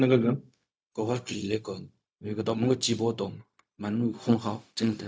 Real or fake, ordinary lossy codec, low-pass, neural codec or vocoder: fake; none; none; codec, 16 kHz, 0.4 kbps, LongCat-Audio-Codec